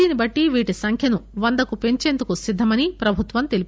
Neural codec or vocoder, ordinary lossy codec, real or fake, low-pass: none; none; real; none